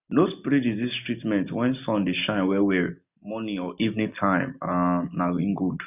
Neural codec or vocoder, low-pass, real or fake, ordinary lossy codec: none; 3.6 kHz; real; none